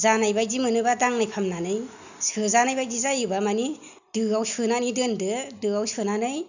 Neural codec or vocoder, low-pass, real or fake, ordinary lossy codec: none; 7.2 kHz; real; none